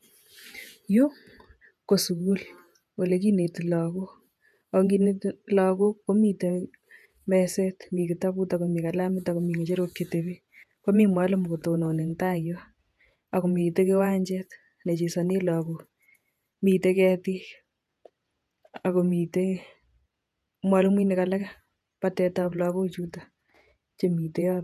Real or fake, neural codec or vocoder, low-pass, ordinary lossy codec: fake; vocoder, 48 kHz, 128 mel bands, Vocos; 14.4 kHz; none